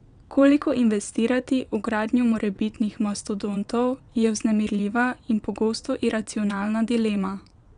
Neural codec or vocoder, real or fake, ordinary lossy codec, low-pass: vocoder, 22.05 kHz, 80 mel bands, WaveNeXt; fake; none; 9.9 kHz